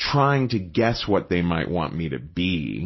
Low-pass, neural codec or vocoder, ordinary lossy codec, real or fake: 7.2 kHz; none; MP3, 24 kbps; real